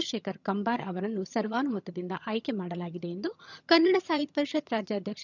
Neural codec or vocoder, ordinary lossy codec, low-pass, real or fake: vocoder, 22.05 kHz, 80 mel bands, HiFi-GAN; none; 7.2 kHz; fake